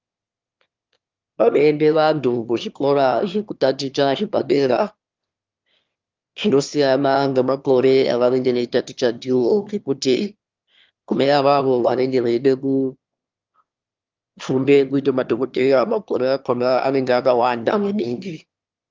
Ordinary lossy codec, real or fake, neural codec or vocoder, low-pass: Opus, 32 kbps; fake; autoencoder, 22.05 kHz, a latent of 192 numbers a frame, VITS, trained on one speaker; 7.2 kHz